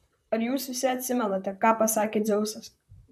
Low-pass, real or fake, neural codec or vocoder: 14.4 kHz; fake; vocoder, 44.1 kHz, 128 mel bands, Pupu-Vocoder